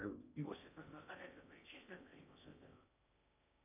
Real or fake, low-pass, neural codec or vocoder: fake; 3.6 kHz; codec, 16 kHz in and 24 kHz out, 0.6 kbps, FocalCodec, streaming, 4096 codes